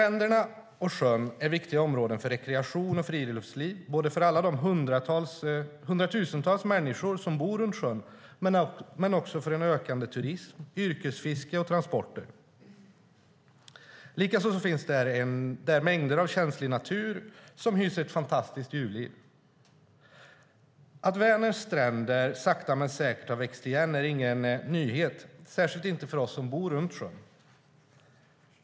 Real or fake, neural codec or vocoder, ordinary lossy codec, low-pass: real; none; none; none